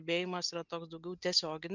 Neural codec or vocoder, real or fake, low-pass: none; real; 7.2 kHz